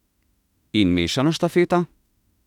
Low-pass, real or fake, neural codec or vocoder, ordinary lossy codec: 19.8 kHz; fake; autoencoder, 48 kHz, 32 numbers a frame, DAC-VAE, trained on Japanese speech; none